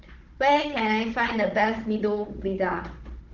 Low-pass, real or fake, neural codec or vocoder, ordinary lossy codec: 7.2 kHz; fake; codec, 16 kHz, 16 kbps, FunCodec, trained on Chinese and English, 50 frames a second; Opus, 16 kbps